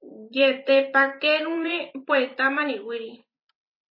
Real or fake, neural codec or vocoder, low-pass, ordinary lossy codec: fake; vocoder, 44.1 kHz, 128 mel bands every 256 samples, BigVGAN v2; 5.4 kHz; MP3, 24 kbps